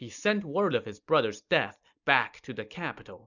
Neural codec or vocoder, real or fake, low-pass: none; real; 7.2 kHz